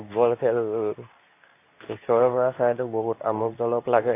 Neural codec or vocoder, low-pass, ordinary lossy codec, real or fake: codec, 24 kHz, 0.9 kbps, WavTokenizer, medium speech release version 2; 3.6 kHz; AAC, 24 kbps; fake